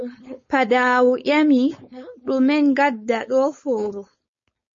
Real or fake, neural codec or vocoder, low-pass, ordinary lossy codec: fake; codec, 16 kHz, 4.8 kbps, FACodec; 7.2 kHz; MP3, 32 kbps